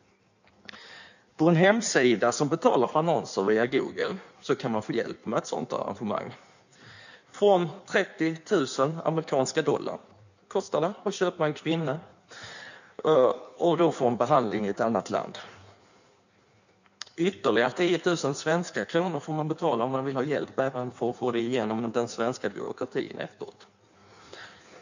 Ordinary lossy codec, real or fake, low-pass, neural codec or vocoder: none; fake; 7.2 kHz; codec, 16 kHz in and 24 kHz out, 1.1 kbps, FireRedTTS-2 codec